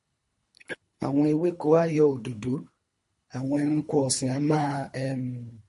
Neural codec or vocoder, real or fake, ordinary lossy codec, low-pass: codec, 24 kHz, 3 kbps, HILCodec; fake; MP3, 48 kbps; 10.8 kHz